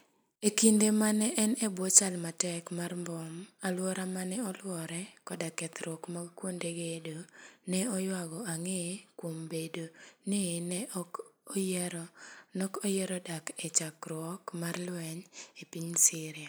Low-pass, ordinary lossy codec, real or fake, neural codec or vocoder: none; none; real; none